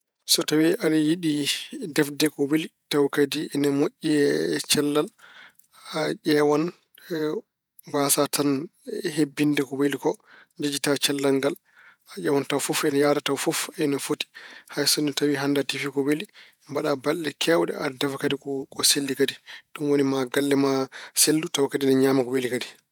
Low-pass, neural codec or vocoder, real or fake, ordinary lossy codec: none; vocoder, 48 kHz, 128 mel bands, Vocos; fake; none